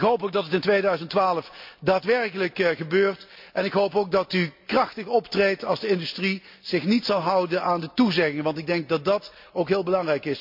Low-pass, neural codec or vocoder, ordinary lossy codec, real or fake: 5.4 kHz; none; MP3, 48 kbps; real